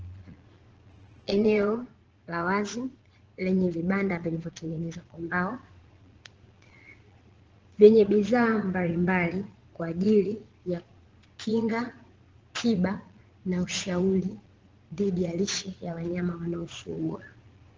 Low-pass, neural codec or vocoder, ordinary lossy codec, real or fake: 7.2 kHz; vocoder, 22.05 kHz, 80 mel bands, Vocos; Opus, 16 kbps; fake